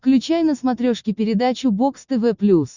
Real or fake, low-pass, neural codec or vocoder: real; 7.2 kHz; none